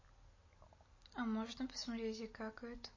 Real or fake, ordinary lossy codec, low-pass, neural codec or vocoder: real; MP3, 32 kbps; 7.2 kHz; none